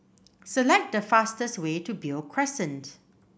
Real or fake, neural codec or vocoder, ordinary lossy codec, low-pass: real; none; none; none